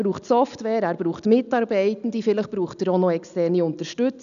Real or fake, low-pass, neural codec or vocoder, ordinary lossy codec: real; 7.2 kHz; none; none